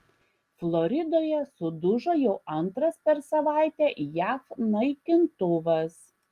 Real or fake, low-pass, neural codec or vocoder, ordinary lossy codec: real; 14.4 kHz; none; Opus, 24 kbps